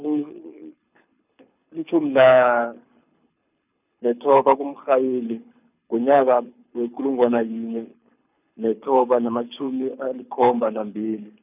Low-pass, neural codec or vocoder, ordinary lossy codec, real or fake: 3.6 kHz; codec, 24 kHz, 6 kbps, HILCodec; none; fake